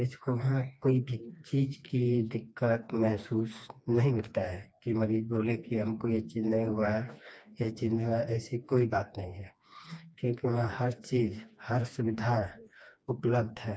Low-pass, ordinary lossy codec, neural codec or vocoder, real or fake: none; none; codec, 16 kHz, 2 kbps, FreqCodec, smaller model; fake